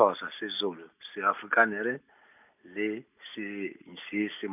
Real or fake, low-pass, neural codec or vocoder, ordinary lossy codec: real; 3.6 kHz; none; none